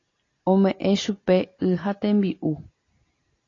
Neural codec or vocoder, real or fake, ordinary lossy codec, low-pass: none; real; AAC, 32 kbps; 7.2 kHz